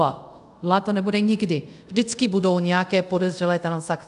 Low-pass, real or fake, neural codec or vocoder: 10.8 kHz; fake; codec, 24 kHz, 0.5 kbps, DualCodec